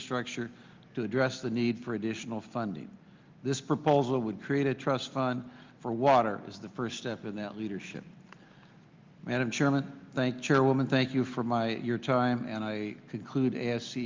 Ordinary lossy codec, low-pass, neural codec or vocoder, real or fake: Opus, 24 kbps; 7.2 kHz; none; real